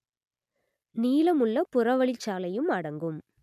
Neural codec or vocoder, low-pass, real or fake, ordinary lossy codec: none; 14.4 kHz; real; none